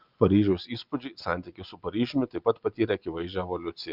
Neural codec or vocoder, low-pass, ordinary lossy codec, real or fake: none; 5.4 kHz; Opus, 32 kbps; real